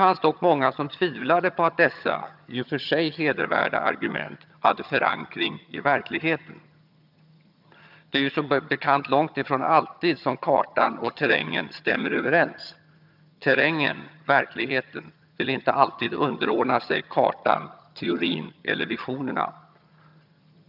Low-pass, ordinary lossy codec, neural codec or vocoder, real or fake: 5.4 kHz; none; vocoder, 22.05 kHz, 80 mel bands, HiFi-GAN; fake